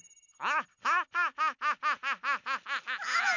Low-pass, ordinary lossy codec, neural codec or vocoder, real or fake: 7.2 kHz; none; none; real